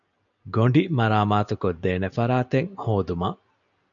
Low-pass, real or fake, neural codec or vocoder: 7.2 kHz; real; none